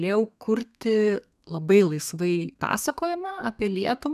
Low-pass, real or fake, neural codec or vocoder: 14.4 kHz; fake; codec, 32 kHz, 1.9 kbps, SNAC